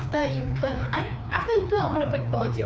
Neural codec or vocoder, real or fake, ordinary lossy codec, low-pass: codec, 16 kHz, 2 kbps, FreqCodec, larger model; fake; none; none